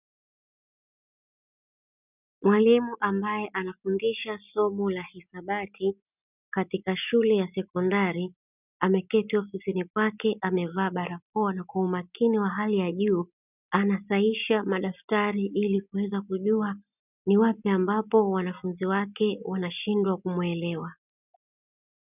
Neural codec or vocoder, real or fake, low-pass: none; real; 3.6 kHz